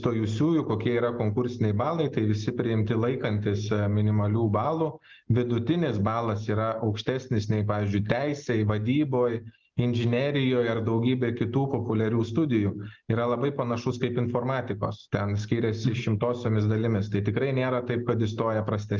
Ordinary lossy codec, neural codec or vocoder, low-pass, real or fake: Opus, 32 kbps; none; 7.2 kHz; real